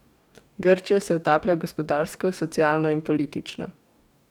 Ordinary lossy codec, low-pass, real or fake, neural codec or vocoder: none; 19.8 kHz; fake; codec, 44.1 kHz, 2.6 kbps, DAC